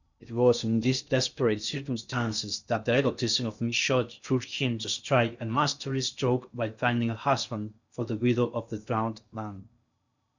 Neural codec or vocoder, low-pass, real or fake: codec, 16 kHz in and 24 kHz out, 0.6 kbps, FocalCodec, streaming, 2048 codes; 7.2 kHz; fake